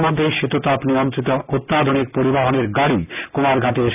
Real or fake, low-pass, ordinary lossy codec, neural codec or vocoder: real; 3.6 kHz; none; none